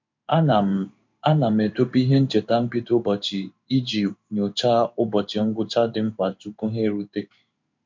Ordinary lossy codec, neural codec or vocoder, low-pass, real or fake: MP3, 48 kbps; codec, 16 kHz in and 24 kHz out, 1 kbps, XY-Tokenizer; 7.2 kHz; fake